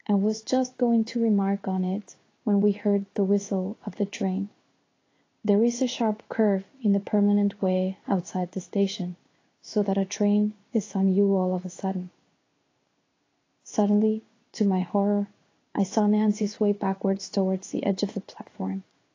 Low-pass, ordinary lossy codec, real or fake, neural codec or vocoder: 7.2 kHz; AAC, 32 kbps; fake; codec, 16 kHz in and 24 kHz out, 1 kbps, XY-Tokenizer